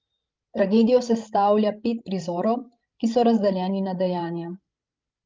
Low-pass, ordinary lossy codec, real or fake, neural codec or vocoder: 7.2 kHz; Opus, 24 kbps; fake; codec, 16 kHz, 16 kbps, FreqCodec, larger model